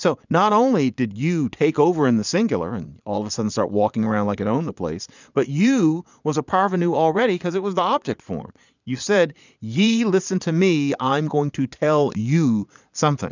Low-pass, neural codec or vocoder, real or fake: 7.2 kHz; vocoder, 22.05 kHz, 80 mel bands, Vocos; fake